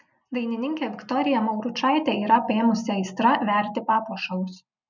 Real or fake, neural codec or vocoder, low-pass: real; none; 7.2 kHz